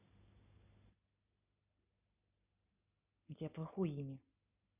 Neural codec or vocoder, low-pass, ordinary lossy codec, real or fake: none; 3.6 kHz; none; real